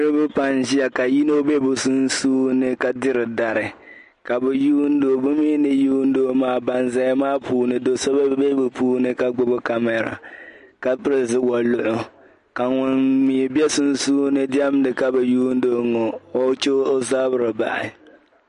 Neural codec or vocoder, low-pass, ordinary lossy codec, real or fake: none; 10.8 kHz; MP3, 48 kbps; real